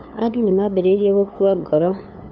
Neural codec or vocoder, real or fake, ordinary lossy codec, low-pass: codec, 16 kHz, 2 kbps, FunCodec, trained on LibriTTS, 25 frames a second; fake; none; none